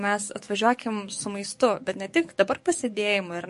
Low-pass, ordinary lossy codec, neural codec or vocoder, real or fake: 14.4 kHz; MP3, 48 kbps; codec, 44.1 kHz, 7.8 kbps, DAC; fake